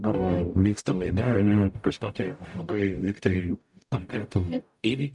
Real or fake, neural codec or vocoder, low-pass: fake; codec, 44.1 kHz, 0.9 kbps, DAC; 10.8 kHz